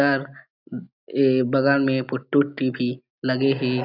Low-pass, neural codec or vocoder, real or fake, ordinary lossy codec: 5.4 kHz; none; real; none